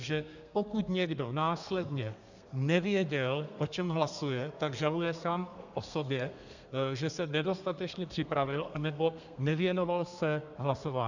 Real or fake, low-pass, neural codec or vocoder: fake; 7.2 kHz; codec, 32 kHz, 1.9 kbps, SNAC